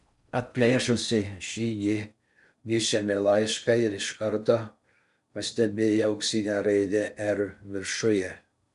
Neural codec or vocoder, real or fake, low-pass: codec, 16 kHz in and 24 kHz out, 0.6 kbps, FocalCodec, streaming, 4096 codes; fake; 10.8 kHz